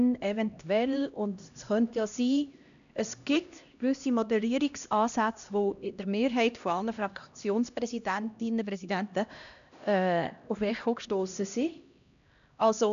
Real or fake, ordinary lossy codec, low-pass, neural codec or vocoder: fake; none; 7.2 kHz; codec, 16 kHz, 1 kbps, X-Codec, HuBERT features, trained on LibriSpeech